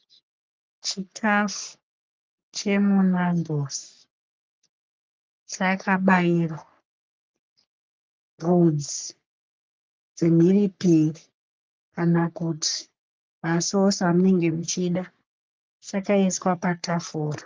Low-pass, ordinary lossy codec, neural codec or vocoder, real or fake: 7.2 kHz; Opus, 24 kbps; codec, 44.1 kHz, 3.4 kbps, Pupu-Codec; fake